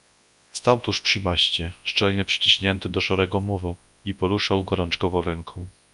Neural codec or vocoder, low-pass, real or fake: codec, 24 kHz, 0.9 kbps, WavTokenizer, large speech release; 10.8 kHz; fake